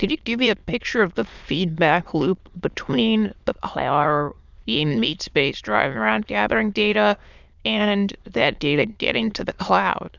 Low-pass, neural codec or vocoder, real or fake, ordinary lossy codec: 7.2 kHz; autoencoder, 22.05 kHz, a latent of 192 numbers a frame, VITS, trained on many speakers; fake; Opus, 64 kbps